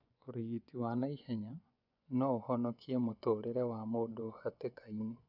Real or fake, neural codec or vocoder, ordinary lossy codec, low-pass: fake; vocoder, 24 kHz, 100 mel bands, Vocos; none; 5.4 kHz